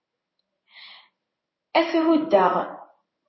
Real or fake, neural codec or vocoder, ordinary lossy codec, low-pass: fake; codec, 16 kHz in and 24 kHz out, 1 kbps, XY-Tokenizer; MP3, 24 kbps; 7.2 kHz